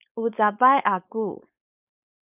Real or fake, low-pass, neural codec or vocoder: fake; 3.6 kHz; codec, 16 kHz, 4.8 kbps, FACodec